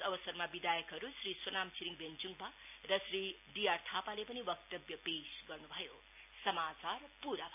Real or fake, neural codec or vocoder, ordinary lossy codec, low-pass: real; none; AAC, 32 kbps; 3.6 kHz